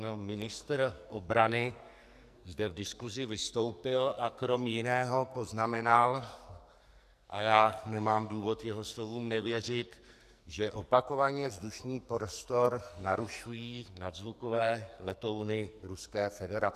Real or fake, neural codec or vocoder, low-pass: fake; codec, 44.1 kHz, 2.6 kbps, SNAC; 14.4 kHz